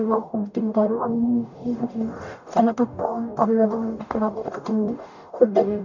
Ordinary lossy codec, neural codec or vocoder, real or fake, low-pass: none; codec, 44.1 kHz, 0.9 kbps, DAC; fake; 7.2 kHz